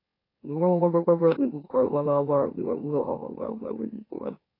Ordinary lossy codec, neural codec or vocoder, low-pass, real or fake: AAC, 24 kbps; autoencoder, 44.1 kHz, a latent of 192 numbers a frame, MeloTTS; 5.4 kHz; fake